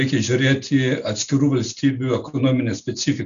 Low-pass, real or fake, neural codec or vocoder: 7.2 kHz; real; none